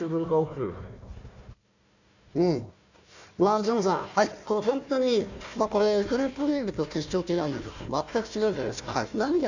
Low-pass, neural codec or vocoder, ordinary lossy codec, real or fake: 7.2 kHz; codec, 16 kHz, 1 kbps, FunCodec, trained on Chinese and English, 50 frames a second; none; fake